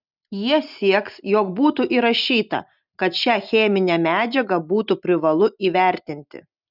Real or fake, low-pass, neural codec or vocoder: real; 5.4 kHz; none